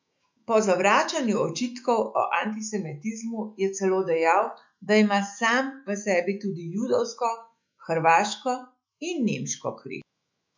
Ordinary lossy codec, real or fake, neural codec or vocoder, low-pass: MP3, 64 kbps; fake; autoencoder, 48 kHz, 128 numbers a frame, DAC-VAE, trained on Japanese speech; 7.2 kHz